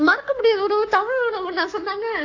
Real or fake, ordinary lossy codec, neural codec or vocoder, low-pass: fake; AAC, 48 kbps; codec, 44.1 kHz, 7.8 kbps, Pupu-Codec; 7.2 kHz